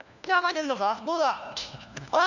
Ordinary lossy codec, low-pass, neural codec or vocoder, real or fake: none; 7.2 kHz; codec, 16 kHz, 1 kbps, FunCodec, trained on LibriTTS, 50 frames a second; fake